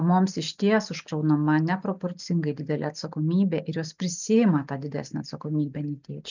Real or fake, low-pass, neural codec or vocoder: real; 7.2 kHz; none